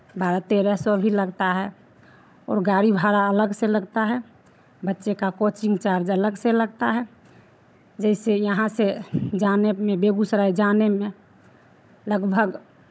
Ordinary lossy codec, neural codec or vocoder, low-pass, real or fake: none; codec, 16 kHz, 16 kbps, FunCodec, trained on Chinese and English, 50 frames a second; none; fake